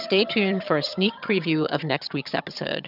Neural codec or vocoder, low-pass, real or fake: vocoder, 22.05 kHz, 80 mel bands, HiFi-GAN; 5.4 kHz; fake